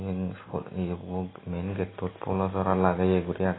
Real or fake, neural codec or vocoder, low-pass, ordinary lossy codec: real; none; 7.2 kHz; AAC, 16 kbps